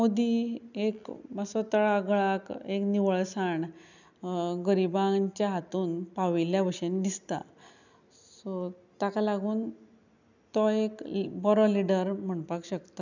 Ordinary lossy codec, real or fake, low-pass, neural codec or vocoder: none; real; 7.2 kHz; none